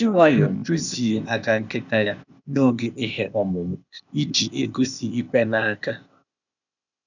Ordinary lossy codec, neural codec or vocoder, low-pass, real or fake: none; codec, 16 kHz, 0.8 kbps, ZipCodec; 7.2 kHz; fake